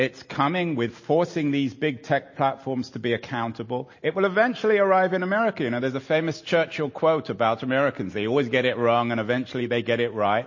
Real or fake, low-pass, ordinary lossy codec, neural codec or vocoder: real; 7.2 kHz; MP3, 32 kbps; none